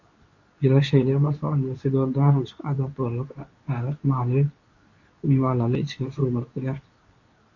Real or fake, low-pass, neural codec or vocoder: fake; 7.2 kHz; codec, 24 kHz, 0.9 kbps, WavTokenizer, medium speech release version 2